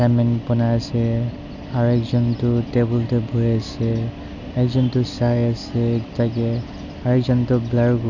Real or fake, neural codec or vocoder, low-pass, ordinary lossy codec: real; none; 7.2 kHz; none